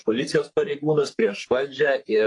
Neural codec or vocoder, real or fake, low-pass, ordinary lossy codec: codec, 44.1 kHz, 3.4 kbps, Pupu-Codec; fake; 10.8 kHz; AAC, 48 kbps